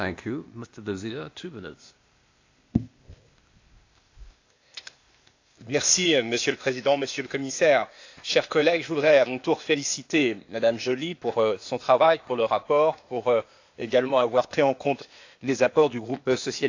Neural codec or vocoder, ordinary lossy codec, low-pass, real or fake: codec, 16 kHz, 0.8 kbps, ZipCodec; AAC, 48 kbps; 7.2 kHz; fake